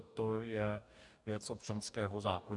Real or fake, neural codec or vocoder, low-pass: fake; codec, 44.1 kHz, 2.6 kbps, DAC; 10.8 kHz